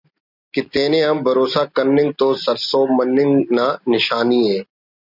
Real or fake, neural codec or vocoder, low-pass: real; none; 5.4 kHz